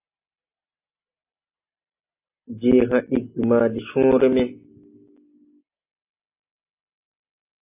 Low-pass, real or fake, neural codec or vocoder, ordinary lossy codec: 3.6 kHz; real; none; AAC, 32 kbps